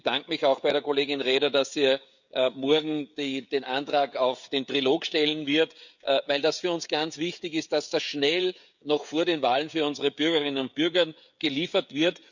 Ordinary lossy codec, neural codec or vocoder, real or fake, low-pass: none; codec, 16 kHz, 16 kbps, FreqCodec, smaller model; fake; 7.2 kHz